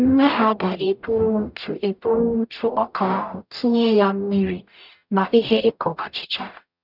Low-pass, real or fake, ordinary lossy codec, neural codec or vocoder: 5.4 kHz; fake; none; codec, 44.1 kHz, 0.9 kbps, DAC